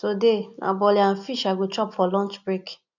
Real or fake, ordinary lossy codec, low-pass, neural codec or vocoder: real; none; 7.2 kHz; none